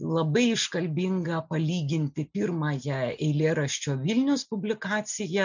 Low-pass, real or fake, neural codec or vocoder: 7.2 kHz; real; none